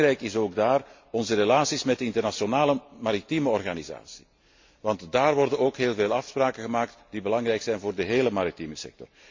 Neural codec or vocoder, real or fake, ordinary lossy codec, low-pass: none; real; MP3, 48 kbps; 7.2 kHz